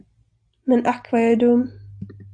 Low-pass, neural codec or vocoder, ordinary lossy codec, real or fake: 9.9 kHz; none; AAC, 64 kbps; real